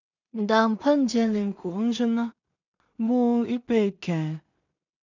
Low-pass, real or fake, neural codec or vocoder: 7.2 kHz; fake; codec, 16 kHz in and 24 kHz out, 0.4 kbps, LongCat-Audio-Codec, two codebook decoder